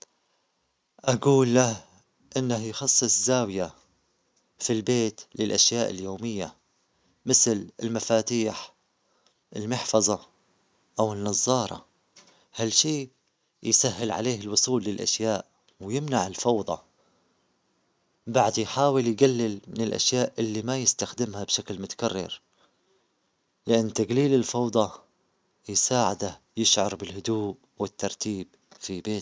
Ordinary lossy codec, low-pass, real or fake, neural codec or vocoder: none; none; real; none